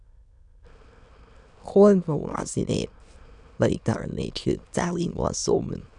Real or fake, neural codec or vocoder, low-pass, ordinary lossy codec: fake; autoencoder, 22.05 kHz, a latent of 192 numbers a frame, VITS, trained on many speakers; 9.9 kHz; none